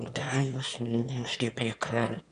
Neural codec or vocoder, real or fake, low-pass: autoencoder, 22.05 kHz, a latent of 192 numbers a frame, VITS, trained on one speaker; fake; 9.9 kHz